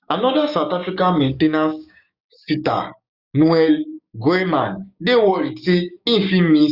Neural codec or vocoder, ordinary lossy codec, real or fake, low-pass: codec, 16 kHz, 6 kbps, DAC; Opus, 64 kbps; fake; 5.4 kHz